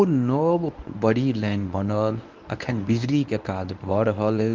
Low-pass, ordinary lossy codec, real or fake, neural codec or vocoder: 7.2 kHz; Opus, 32 kbps; fake; codec, 24 kHz, 0.9 kbps, WavTokenizer, medium speech release version 1